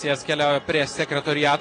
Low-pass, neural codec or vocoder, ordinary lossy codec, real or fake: 9.9 kHz; none; AAC, 32 kbps; real